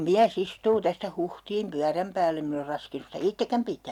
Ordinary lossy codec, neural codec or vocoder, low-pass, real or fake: none; none; 19.8 kHz; real